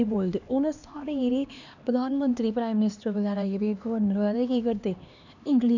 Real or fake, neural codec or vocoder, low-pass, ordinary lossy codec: fake; codec, 16 kHz, 2 kbps, X-Codec, HuBERT features, trained on LibriSpeech; 7.2 kHz; none